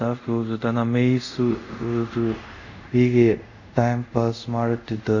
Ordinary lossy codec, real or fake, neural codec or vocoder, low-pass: none; fake; codec, 24 kHz, 0.5 kbps, DualCodec; 7.2 kHz